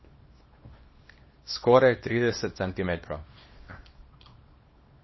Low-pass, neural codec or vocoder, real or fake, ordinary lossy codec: 7.2 kHz; codec, 24 kHz, 0.9 kbps, WavTokenizer, small release; fake; MP3, 24 kbps